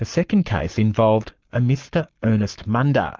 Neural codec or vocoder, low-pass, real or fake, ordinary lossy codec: codec, 44.1 kHz, 7.8 kbps, Pupu-Codec; 7.2 kHz; fake; Opus, 24 kbps